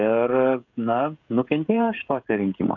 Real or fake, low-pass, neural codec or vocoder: fake; 7.2 kHz; codec, 16 kHz, 16 kbps, FreqCodec, smaller model